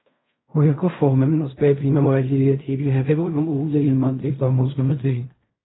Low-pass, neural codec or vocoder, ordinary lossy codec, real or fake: 7.2 kHz; codec, 16 kHz in and 24 kHz out, 0.4 kbps, LongCat-Audio-Codec, fine tuned four codebook decoder; AAC, 16 kbps; fake